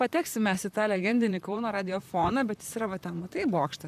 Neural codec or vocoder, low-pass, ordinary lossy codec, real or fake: vocoder, 44.1 kHz, 128 mel bands, Pupu-Vocoder; 14.4 kHz; AAC, 96 kbps; fake